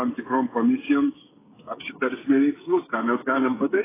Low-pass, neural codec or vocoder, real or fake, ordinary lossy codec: 3.6 kHz; codec, 16 kHz, 16 kbps, FreqCodec, smaller model; fake; AAC, 16 kbps